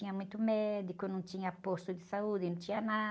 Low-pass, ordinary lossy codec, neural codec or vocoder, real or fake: none; none; none; real